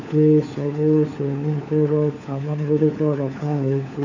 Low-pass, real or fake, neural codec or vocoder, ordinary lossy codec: 7.2 kHz; fake; codec, 16 kHz, 4 kbps, FunCodec, trained on LibriTTS, 50 frames a second; none